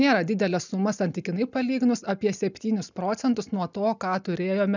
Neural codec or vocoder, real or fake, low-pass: none; real; 7.2 kHz